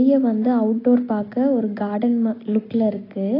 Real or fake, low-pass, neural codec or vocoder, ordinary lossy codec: real; 5.4 kHz; none; AAC, 24 kbps